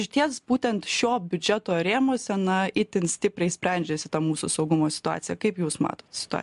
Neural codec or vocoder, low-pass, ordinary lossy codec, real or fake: none; 10.8 kHz; MP3, 64 kbps; real